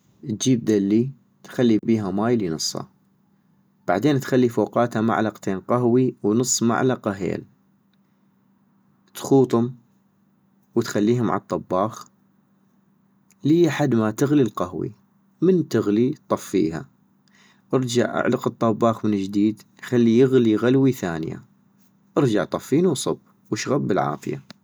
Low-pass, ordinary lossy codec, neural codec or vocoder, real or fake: none; none; none; real